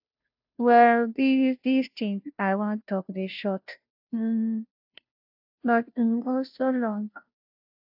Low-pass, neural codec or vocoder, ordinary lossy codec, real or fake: 5.4 kHz; codec, 16 kHz, 0.5 kbps, FunCodec, trained on Chinese and English, 25 frames a second; AAC, 48 kbps; fake